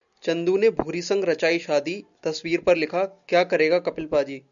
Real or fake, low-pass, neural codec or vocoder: real; 7.2 kHz; none